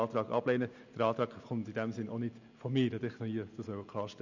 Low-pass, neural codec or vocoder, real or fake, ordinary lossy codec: 7.2 kHz; none; real; none